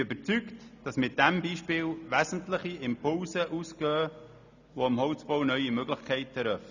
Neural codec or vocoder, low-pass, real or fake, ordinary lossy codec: none; 7.2 kHz; real; none